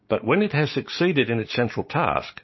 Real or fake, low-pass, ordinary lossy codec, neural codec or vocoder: fake; 7.2 kHz; MP3, 24 kbps; codec, 16 kHz, 6 kbps, DAC